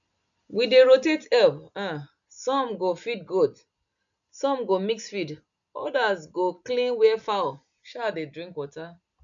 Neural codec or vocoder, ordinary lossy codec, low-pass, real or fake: none; none; 7.2 kHz; real